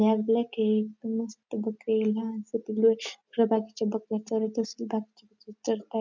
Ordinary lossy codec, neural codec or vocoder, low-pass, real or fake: none; none; 7.2 kHz; real